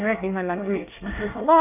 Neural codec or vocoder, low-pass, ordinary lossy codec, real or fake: codec, 24 kHz, 1 kbps, SNAC; 3.6 kHz; none; fake